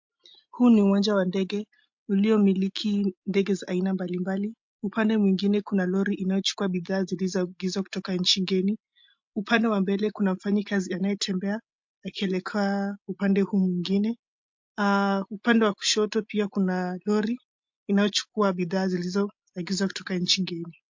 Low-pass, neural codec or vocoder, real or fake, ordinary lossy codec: 7.2 kHz; none; real; MP3, 48 kbps